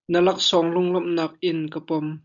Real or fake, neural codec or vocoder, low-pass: real; none; 7.2 kHz